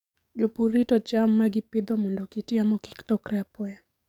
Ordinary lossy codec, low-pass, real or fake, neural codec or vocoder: none; 19.8 kHz; fake; codec, 44.1 kHz, 7.8 kbps, DAC